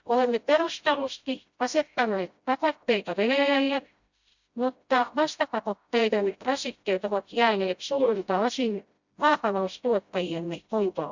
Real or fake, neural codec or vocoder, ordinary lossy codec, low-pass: fake; codec, 16 kHz, 0.5 kbps, FreqCodec, smaller model; Opus, 64 kbps; 7.2 kHz